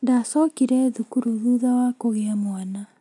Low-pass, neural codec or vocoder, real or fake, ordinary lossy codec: 10.8 kHz; none; real; none